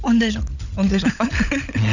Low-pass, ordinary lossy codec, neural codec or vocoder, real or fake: 7.2 kHz; none; codec, 16 kHz, 16 kbps, FunCodec, trained on LibriTTS, 50 frames a second; fake